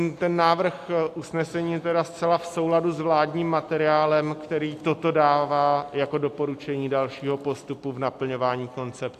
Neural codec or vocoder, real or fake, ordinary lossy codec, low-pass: none; real; AAC, 64 kbps; 14.4 kHz